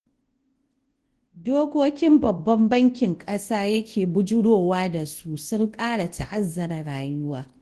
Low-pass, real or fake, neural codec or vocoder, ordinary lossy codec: 10.8 kHz; fake; codec, 24 kHz, 0.9 kbps, WavTokenizer, large speech release; Opus, 16 kbps